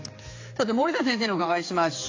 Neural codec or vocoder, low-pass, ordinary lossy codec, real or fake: codec, 16 kHz, 4 kbps, X-Codec, HuBERT features, trained on general audio; 7.2 kHz; AAC, 32 kbps; fake